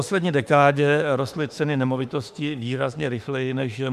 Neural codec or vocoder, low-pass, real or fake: autoencoder, 48 kHz, 32 numbers a frame, DAC-VAE, trained on Japanese speech; 14.4 kHz; fake